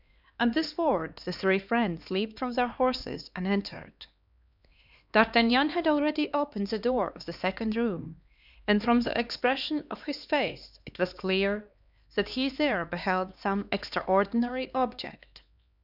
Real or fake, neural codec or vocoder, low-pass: fake; codec, 16 kHz, 2 kbps, X-Codec, HuBERT features, trained on LibriSpeech; 5.4 kHz